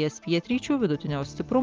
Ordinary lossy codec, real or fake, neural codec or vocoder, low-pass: Opus, 32 kbps; real; none; 7.2 kHz